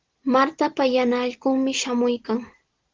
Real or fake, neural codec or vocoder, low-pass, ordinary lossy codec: real; none; 7.2 kHz; Opus, 16 kbps